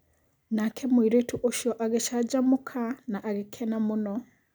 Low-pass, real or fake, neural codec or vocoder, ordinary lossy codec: none; real; none; none